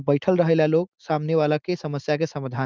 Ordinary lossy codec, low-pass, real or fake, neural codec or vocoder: Opus, 32 kbps; 7.2 kHz; real; none